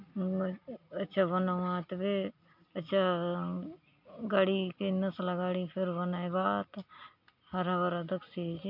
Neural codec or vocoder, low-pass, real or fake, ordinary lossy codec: none; 5.4 kHz; real; MP3, 48 kbps